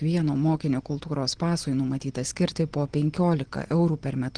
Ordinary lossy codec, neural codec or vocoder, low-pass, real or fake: Opus, 16 kbps; none; 9.9 kHz; real